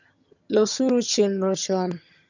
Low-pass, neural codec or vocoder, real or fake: 7.2 kHz; vocoder, 22.05 kHz, 80 mel bands, HiFi-GAN; fake